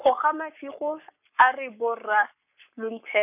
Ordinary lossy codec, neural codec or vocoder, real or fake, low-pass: none; codec, 44.1 kHz, 7.8 kbps, Pupu-Codec; fake; 3.6 kHz